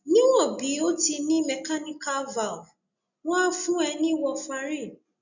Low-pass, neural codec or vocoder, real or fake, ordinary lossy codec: 7.2 kHz; none; real; none